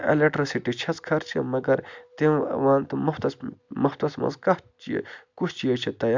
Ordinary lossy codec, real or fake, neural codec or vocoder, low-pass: MP3, 64 kbps; real; none; 7.2 kHz